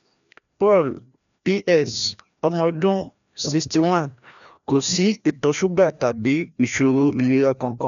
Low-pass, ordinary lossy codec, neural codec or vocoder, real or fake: 7.2 kHz; MP3, 96 kbps; codec, 16 kHz, 1 kbps, FreqCodec, larger model; fake